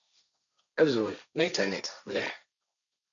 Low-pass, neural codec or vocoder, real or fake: 7.2 kHz; codec, 16 kHz, 1.1 kbps, Voila-Tokenizer; fake